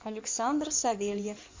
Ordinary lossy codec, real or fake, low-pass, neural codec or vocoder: MP3, 48 kbps; fake; 7.2 kHz; codec, 16 kHz in and 24 kHz out, 2.2 kbps, FireRedTTS-2 codec